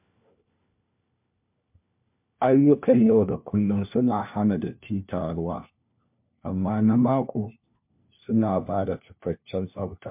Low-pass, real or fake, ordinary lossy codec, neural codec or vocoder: 3.6 kHz; fake; MP3, 32 kbps; codec, 16 kHz, 1 kbps, FunCodec, trained on LibriTTS, 50 frames a second